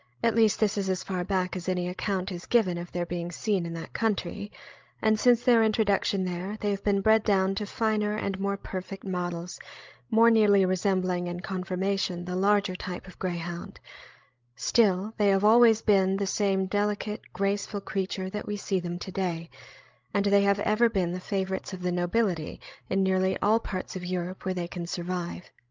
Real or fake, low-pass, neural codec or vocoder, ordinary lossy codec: fake; 7.2 kHz; codec, 16 kHz, 16 kbps, FreqCodec, larger model; Opus, 32 kbps